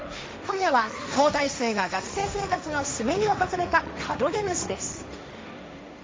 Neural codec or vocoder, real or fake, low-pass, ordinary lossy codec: codec, 16 kHz, 1.1 kbps, Voila-Tokenizer; fake; none; none